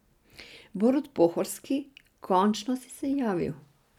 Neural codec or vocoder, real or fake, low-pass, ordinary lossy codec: none; real; 19.8 kHz; none